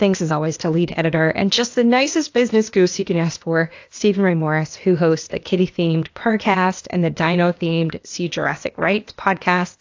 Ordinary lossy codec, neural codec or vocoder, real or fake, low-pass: AAC, 48 kbps; codec, 16 kHz, 0.8 kbps, ZipCodec; fake; 7.2 kHz